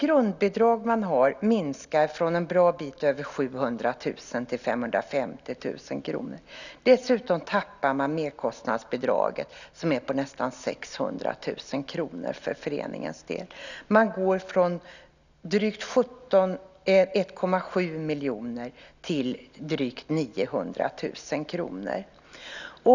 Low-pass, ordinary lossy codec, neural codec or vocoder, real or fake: 7.2 kHz; none; none; real